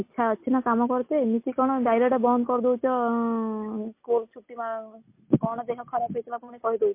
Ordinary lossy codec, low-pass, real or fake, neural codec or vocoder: MP3, 24 kbps; 3.6 kHz; real; none